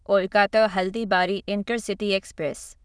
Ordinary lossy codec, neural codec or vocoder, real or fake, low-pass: none; autoencoder, 22.05 kHz, a latent of 192 numbers a frame, VITS, trained on many speakers; fake; none